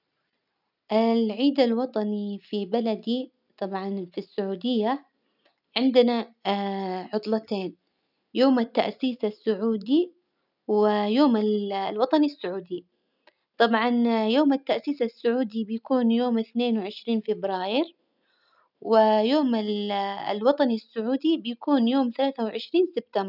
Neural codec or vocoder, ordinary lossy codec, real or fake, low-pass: none; none; real; 5.4 kHz